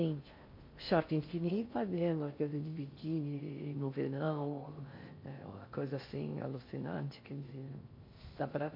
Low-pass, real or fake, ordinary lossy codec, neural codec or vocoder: 5.4 kHz; fake; MP3, 32 kbps; codec, 16 kHz in and 24 kHz out, 0.6 kbps, FocalCodec, streaming, 4096 codes